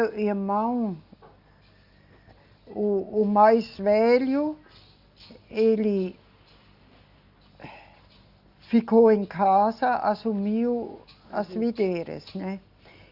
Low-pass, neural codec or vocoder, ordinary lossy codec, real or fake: 5.4 kHz; none; Opus, 64 kbps; real